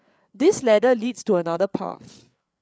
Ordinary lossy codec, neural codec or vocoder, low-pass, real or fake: none; codec, 16 kHz, 8 kbps, FreqCodec, larger model; none; fake